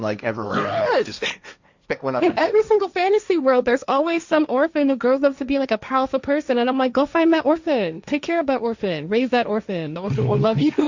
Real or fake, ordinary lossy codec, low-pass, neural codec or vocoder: fake; Opus, 64 kbps; 7.2 kHz; codec, 16 kHz, 1.1 kbps, Voila-Tokenizer